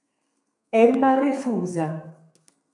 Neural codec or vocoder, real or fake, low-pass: codec, 32 kHz, 1.9 kbps, SNAC; fake; 10.8 kHz